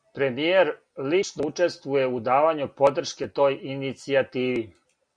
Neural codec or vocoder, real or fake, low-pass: none; real; 9.9 kHz